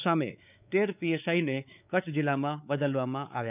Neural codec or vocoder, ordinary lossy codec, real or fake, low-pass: codec, 16 kHz, 4 kbps, X-Codec, WavLM features, trained on Multilingual LibriSpeech; none; fake; 3.6 kHz